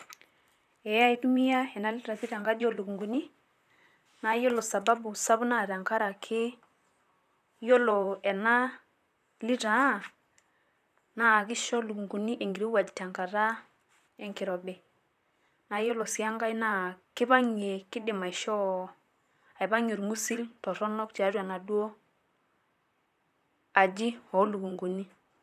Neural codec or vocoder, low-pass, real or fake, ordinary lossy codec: vocoder, 44.1 kHz, 128 mel bands, Pupu-Vocoder; 14.4 kHz; fake; none